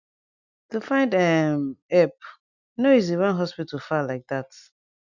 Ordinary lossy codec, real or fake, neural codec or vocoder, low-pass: none; real; none; 7.2 kHz